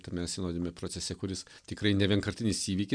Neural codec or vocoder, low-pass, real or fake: none; 9.9 kHz; real